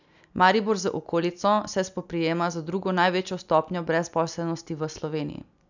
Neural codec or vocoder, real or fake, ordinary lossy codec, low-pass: none; real; none; 7.2 kHz